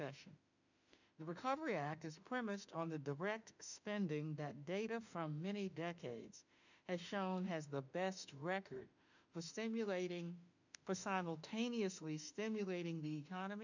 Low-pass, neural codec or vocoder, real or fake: 7.2 kHz; autoencoder, 48 kHz, 32 numbers a frame, DAC-VAE, trained on Japanese speech; fake